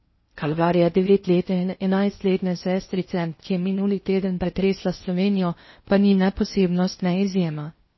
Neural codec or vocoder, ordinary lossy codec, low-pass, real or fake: codec, 16 kHz in and 24 kHz out, 0.6 kbps, FocalCodec, streaming, 4096 codes; MP3, 24 kbps; 7.2 kHz; fake